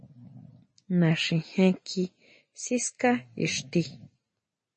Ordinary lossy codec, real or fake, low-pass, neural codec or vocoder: MP3, 32 kbps; real; 10.8 kHz; none